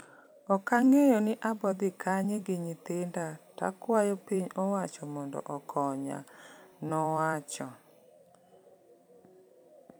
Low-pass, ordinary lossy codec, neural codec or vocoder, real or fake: none; none; vocoder, 44.1 kHz, 128 mel bands every 256 samples, BigVGAN v2; fake